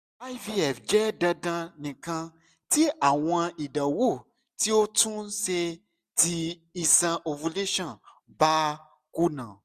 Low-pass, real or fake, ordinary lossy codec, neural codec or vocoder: 14.4 kHz; real; none; none